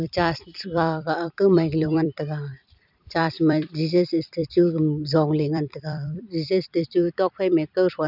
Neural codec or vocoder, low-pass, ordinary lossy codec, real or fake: vocoder, 44.1 kHz, 128 mel bands every 256 samples, BigVGAN v2; 5.4 kHz; none; fake